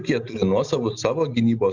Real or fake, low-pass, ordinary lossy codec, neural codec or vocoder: real; 7.2 kHz; Opus, 64 kbps; none